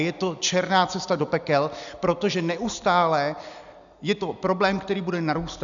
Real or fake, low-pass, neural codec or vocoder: real; 7.2 kHz; none